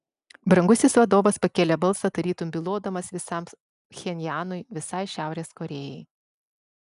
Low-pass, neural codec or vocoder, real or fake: 10.8 kHz; none; real